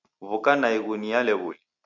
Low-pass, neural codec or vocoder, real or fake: 7.2 kHz; none; real